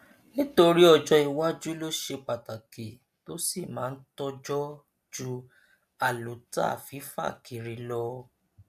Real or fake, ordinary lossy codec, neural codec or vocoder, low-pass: real; AAC, 96 kbps; none; 14.4 kHz